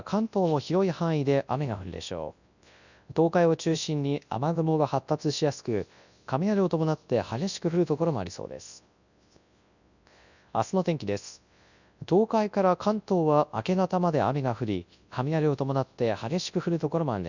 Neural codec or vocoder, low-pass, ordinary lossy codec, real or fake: codec, 24 kHz, 0.9 kbps, WavTokenizer, large speech release; 7.2 kHz; none; fake